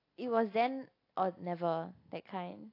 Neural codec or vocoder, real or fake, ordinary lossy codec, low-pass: none; real; AAC, 32 kbps; 5.4 kHz